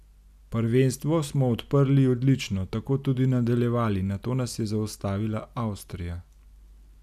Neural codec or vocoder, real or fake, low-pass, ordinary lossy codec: none; real; 14.4 kHz; none